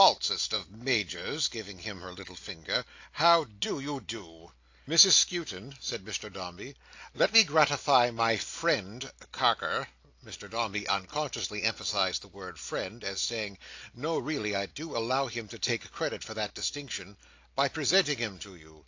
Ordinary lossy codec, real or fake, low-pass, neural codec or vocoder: AAC, 48 kbps; real; 7.2 kHz; none